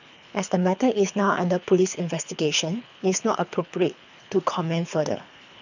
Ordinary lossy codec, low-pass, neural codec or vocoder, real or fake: none; 7.2 kHz; codec, 24 kHz, 3 kbps, HILCodec; fake